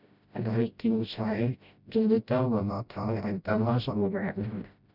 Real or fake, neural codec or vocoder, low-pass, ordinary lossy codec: fake; codec, 16 kHz, 0.5 kbps, FreqCodec, smaller model; 5.4 kHz; none